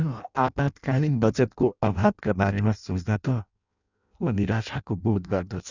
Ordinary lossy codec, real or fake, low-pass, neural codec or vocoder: none; fake; 7.2 kHz; codec, 16 kHz in and 24 kHz out, 0.6 kbps, FireRedTTS-2 codec